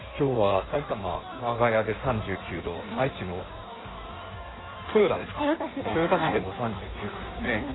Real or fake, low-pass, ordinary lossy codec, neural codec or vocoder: fake; 7.2 kHz; AAC, 16 kbps; codec, 16 kHz in and 24 kHz out, 1.1 kbps, FireRedTTS-2 codec